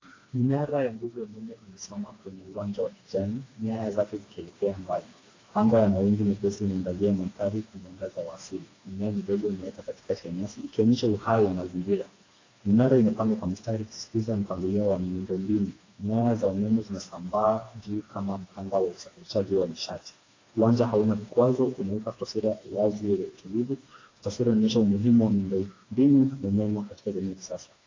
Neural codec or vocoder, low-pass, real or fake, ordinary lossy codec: codec, 16 kHz, 2 kbps, FreqCodec, smaller model; 7.2 kHz; fake; AAC, 32 kbps